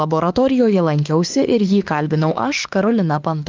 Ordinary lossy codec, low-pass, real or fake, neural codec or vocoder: Opus, 24 kbps; 7.2 kHz; fake; autoencoder, 48 kHz, 32 numbers a frame, DAC-VAE, trained on Japanese speech